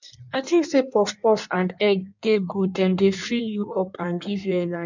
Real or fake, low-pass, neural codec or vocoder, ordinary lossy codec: fake; 7.2 kHz; codec, 16 kHz in and 24 kHz out, 1.1 kbps, FireRedTTS-2 codec; none